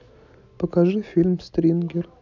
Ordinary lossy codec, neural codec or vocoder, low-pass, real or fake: none; none; 7.2 kHz; real